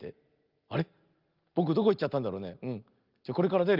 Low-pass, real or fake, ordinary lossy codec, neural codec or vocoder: 5.4 kHz; real; Opus, 32 kbps; none